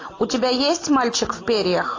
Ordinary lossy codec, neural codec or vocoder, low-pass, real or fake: AAC, 32 kbps; none; 7.2 kHz; real